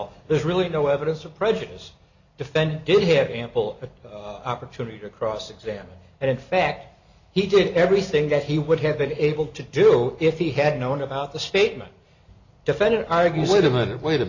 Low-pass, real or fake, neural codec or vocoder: 7.2 kHz; real; none